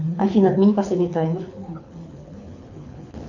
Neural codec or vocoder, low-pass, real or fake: codec, 24 kHz, 6 kbps, HILCodec; 7.2 kHz; fake